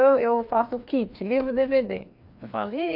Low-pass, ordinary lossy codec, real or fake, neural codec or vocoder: 5.4 kHz; MP3, 48 kbps; fake; codec, 16 kHz, 2 kbps, FreqCodec, larger model